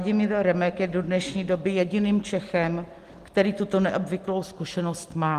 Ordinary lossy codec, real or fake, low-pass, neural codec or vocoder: Opus, 24 kbps; real; 14.4 kHz; none